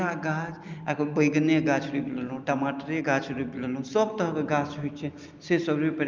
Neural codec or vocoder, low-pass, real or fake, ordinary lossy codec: none; 7.2 kHz; real; Opus, 24 kbps